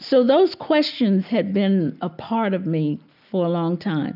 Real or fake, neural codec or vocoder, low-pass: real; none; 5.4 kHz